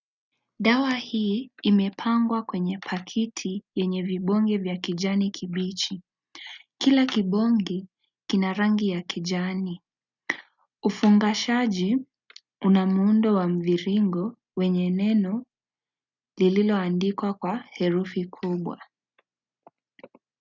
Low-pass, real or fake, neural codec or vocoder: 7.2 kHz; real; none